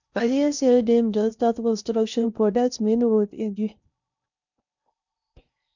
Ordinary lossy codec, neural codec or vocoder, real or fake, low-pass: none; codec, 16 kHz in and 24 kHz out, 0.6 kbps, FocalCodec, streaming, 4096 codes; fake; 7.2 kHz